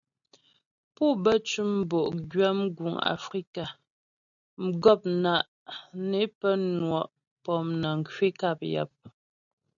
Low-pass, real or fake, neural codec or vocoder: 7.2 kHz; real; none